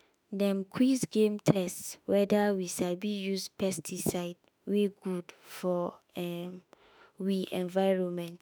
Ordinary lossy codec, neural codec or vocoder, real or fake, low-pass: none; autoencoder, 48 kHz, 32 numbers a frame, DAC-VAE, trained on Japanese speech; fake; none